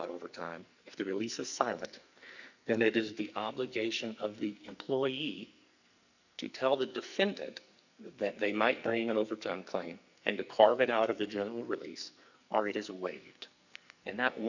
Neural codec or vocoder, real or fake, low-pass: codec, 44.1 kHz, 2.6 kbps, SNAC; fake; 7.2 kHz